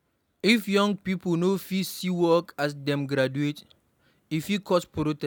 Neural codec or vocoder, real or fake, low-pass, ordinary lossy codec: none; real; 19.8 kHz; none